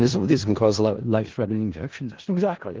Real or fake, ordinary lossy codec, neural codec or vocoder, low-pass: fake; Opus, 16 kbps; codec, 16 kHz in and 24 kHz out, 0.4 kbps, LongCat-Audio-Codec, four codebook decoder; 7.2 kHz